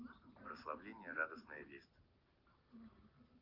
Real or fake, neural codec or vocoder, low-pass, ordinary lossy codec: real; none; 5.4 kHz; Opus, 32 kbps